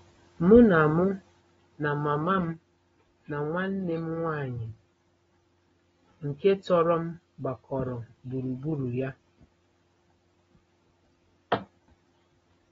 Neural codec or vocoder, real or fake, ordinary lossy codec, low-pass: none; real; AAC, 24 kbps; 19.8 kHz